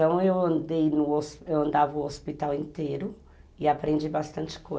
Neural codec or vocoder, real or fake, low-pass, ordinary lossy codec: none; real; none; none